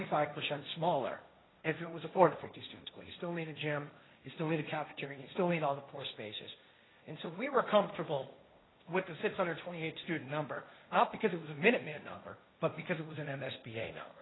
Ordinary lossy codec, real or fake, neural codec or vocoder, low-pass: AAC, 16 kbps; fake; codec, 16 kHz, 1.1 kbps, Voila-Tokenizer; 7.2 kHz